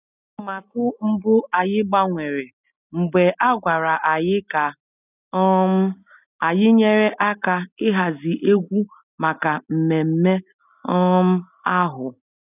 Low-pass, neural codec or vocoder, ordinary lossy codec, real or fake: 3.6 kHz; none; none; real